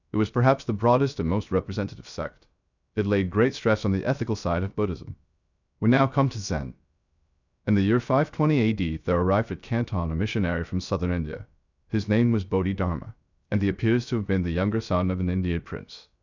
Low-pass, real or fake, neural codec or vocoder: 7.2 kHz; fake; codec, 16 kHz, 0.3 kbps, FocalCodec